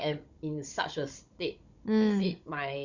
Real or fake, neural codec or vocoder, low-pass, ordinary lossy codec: fake; codec, 16 kHz, 16 kbps, FunCodec, trained on Chinese and English, 50 frames a second; 7.2 kHz; Opus, 64 kbps